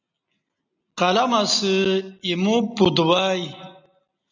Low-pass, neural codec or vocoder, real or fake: 7.2 kHz; none; real